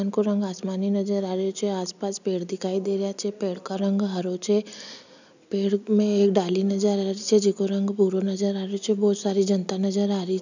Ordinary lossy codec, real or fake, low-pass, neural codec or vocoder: none; real; 7.2 kHz; none